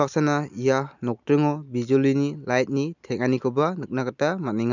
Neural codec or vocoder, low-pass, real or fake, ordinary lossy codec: none; 7.2 kHz; real; none